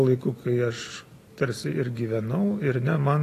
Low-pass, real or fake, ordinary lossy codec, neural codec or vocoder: 14.4 kHz; real; AAC, 48 kbps; none